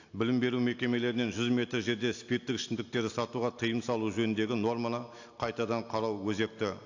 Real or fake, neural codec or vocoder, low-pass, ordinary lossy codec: real; none; 7.2 kHz; AAC, 48 kbps